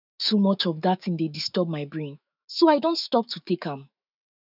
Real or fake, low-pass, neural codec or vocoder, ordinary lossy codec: fake; 5.4 kHz; autoencoder, 48 kHz, 128 numbers a frame, DAC-VAE, trained on Japanese speech; none